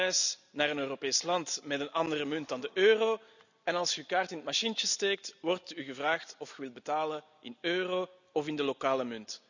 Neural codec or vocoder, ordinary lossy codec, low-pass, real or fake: none; none; 7.2 kHz; real